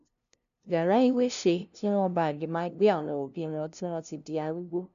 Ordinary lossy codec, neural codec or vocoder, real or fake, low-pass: none; codec, 16 kHz, 0.5 kbps, FunCodec, trained on LibriTTS, 25 frames a second; fake; 7.2 kHz